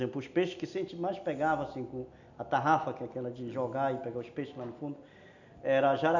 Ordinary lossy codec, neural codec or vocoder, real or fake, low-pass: none; none; real; 7.2 kHz